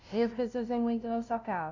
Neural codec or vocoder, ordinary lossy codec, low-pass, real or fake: codec, 16 kHz, 0.5 kbps, FunCodec, trained on LibriTTS, 25 frames a second; none; 7.2 kHz; fake